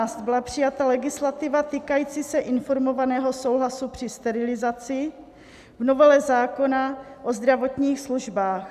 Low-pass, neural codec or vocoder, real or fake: 14.4 kHz; none; real